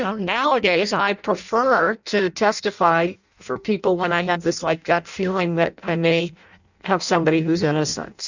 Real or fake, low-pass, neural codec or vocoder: fake; 7.2 kHz; codec, 16 kHz in and 24 kHz out, 0.6 kbps, FireRedTTS-2 codec